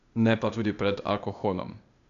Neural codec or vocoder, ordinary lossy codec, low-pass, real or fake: codec, 16 kHz, 0.8 kbps, ZipCodec; none; 7.2 kHz; fake